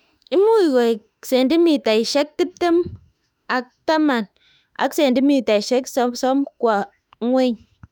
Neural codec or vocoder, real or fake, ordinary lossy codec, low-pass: autoencoder, 48 kHz, 32 numbers a frame, DAC-VAE, trained on Japanese speech; fake; none; 19.8 kHz